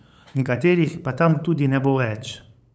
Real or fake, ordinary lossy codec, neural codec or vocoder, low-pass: fake; none; codec, 16 kHz, 8 kbps, FunCodec, trained on LibriTTS, 25 frames a second; none